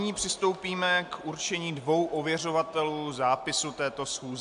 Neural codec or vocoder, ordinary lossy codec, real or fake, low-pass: none; AAC, 64 kbps; real; 10.8 kHz